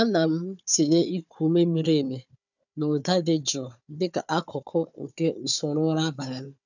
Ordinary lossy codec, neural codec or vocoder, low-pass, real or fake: none; codec, 16 kHz, 4 kbps, FunCodec, trained on Chinese and English, 50 frames a second; 7.2 kHz; fake